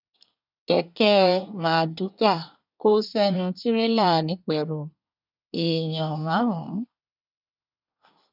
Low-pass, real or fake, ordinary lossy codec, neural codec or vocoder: 5.4 kHz; fake; none; codec, 24 kHz, 1 kbps, SNAC